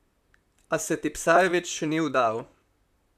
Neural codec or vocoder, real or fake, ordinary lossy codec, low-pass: vocoder, 44.1 kHz, 128 mel bands, Pupu-Vocoder; fake; none; 14.4 kHz